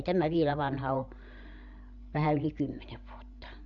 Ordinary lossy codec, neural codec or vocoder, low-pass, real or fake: none; codec, 16 kHz, 16 kbps, FreqCodec, larger model; 7.2 kHz; fake